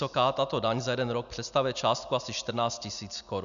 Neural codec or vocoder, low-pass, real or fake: none; 7.2 kHz; real